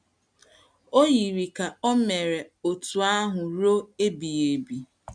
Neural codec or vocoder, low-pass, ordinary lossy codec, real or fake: none; 9.9 kHz; none; real